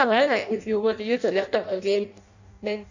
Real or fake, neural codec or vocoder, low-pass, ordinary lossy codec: fake; codec, 16 kHz in and 24 kHz out, 0.6 kbps, FireRedTTS-2 codec; 7.2 kHz; none